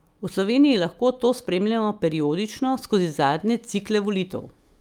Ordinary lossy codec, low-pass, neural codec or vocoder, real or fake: Opus, 32 kbps; 19.8 kHz; autoencoder, 48 kHz, 128 numbers a frame, DAC-VAE, trained on Japanese speech; fake